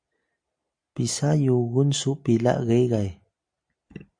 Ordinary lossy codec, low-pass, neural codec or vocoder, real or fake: AAC, 64 kbps; 9.9 kHz; none; real